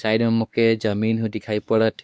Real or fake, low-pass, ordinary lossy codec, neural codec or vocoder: fake; none; none; codec, 16 kHz, 2 kbps, X-Codec, WavLM features, trained on Multilingual LibriSpeech